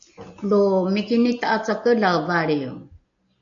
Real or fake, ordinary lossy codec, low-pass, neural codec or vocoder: real; AAC, 64 kbps; 7.2 kHz; none